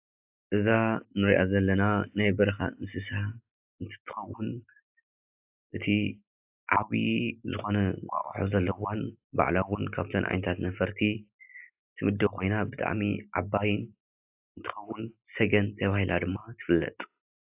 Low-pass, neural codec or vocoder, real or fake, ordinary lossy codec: 3.6 kHz; none; real; AAC, 32 kbps